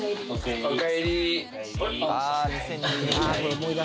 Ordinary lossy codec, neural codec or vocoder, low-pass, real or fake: none; none; none; real